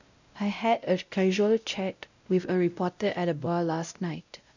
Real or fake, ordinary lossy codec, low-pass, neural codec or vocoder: fake; none; 7.2 kHz; codec, 16 kHz, 0.5 kbps, X-Codec, WavLM features, trained on Multilingual LibriSpeech